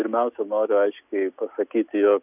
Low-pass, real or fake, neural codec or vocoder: 3.6 kHz; real; none